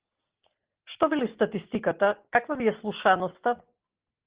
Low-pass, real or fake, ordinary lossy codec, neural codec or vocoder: 3.6 kHz; real; Opus, 16 kbps; none